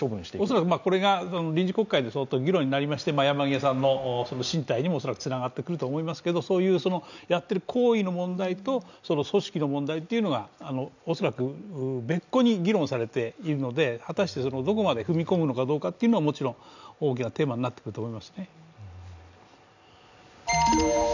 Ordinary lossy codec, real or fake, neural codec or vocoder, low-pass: none; real; none; 7.2 kHz